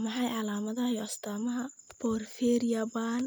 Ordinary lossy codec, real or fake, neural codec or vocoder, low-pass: none; real; none; none